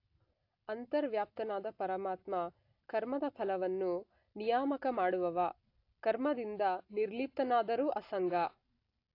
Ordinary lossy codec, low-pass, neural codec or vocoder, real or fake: AAC, 32 kbps; 5.4 kHz; none; real